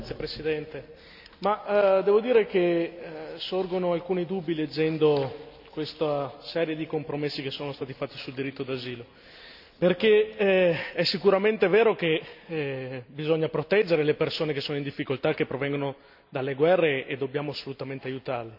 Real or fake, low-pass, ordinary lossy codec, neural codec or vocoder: real; 5.4 kHz; none; none